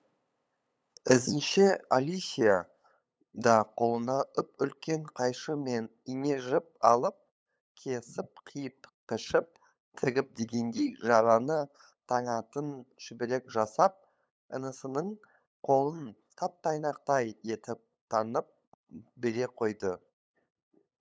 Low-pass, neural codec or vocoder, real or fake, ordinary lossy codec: none; codec, 16 kHz, 8 kbps, FunCodec, trained on LibriTTS, 25 frames a second; fake; none